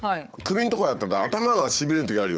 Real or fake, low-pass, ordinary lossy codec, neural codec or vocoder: fake; none; none; codec, 16 kHz, 4 kbps, FunCodec, trained on Chinese and English, 50 frames a second